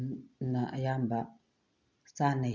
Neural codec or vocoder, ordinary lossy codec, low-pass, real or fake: none; none; 7.2 kHz; real